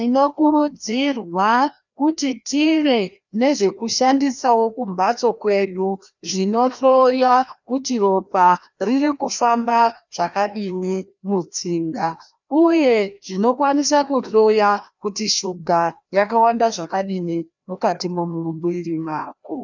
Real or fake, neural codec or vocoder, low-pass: fake; codec, 16 kHz, 1 kbps, FreqCodec, larger model; 7.2 kHz